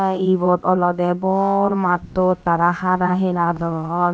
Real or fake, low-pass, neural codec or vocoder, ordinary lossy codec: fake; none; codec, 16 kHz, about 1 kbps, DyCAST, with the encoder's durations; none